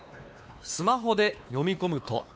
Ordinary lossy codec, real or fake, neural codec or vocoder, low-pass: none; fake; codec, 16 kHz, 2 kbps, X-Codec, WavLM features, trained on Multilingual LibriSpeech; none